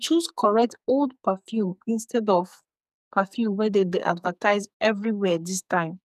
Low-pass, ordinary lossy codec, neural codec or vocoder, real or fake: 14.4 kHz; none; codec, 44.1 kHz, 2.6 kbps, SNAC; fake